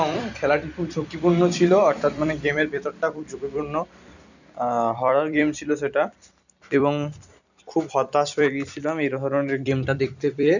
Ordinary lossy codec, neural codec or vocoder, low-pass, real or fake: none; vocoder, 44.1 kHz, 128 mel bands every 256 samples, BigVGAN v2; 7.2 kHz; fake